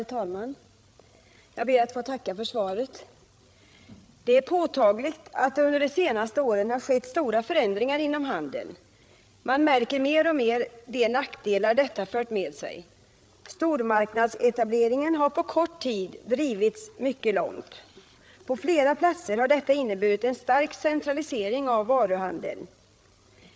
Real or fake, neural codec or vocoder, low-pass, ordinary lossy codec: fake; codec, 16 kHz, 8 kbps, FreqCodec, larger model; none; none